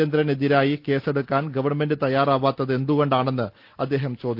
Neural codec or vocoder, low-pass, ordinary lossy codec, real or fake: none; 5.4 kHz; Opus, 32 kbps; real